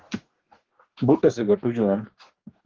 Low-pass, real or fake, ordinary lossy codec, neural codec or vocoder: 7.2 kHz; fake; Opus, 16 kbps; codec, 44.1 kHz, 2.6 kbps, DAC